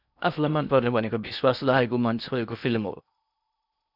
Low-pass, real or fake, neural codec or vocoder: 5.4 kHz; fake; codec, 16 kHz in and 24 kHz out, 0.8 kbps, FocalCodec, streaming, 65536 codes